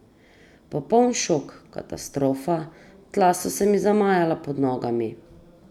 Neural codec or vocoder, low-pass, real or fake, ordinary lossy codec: none; 19.8 kHz; real; none